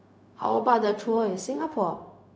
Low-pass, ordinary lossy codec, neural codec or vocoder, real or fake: none; none; codec, 16 kHz, 0.4 kbps, LongCat-Audio-Codec; fake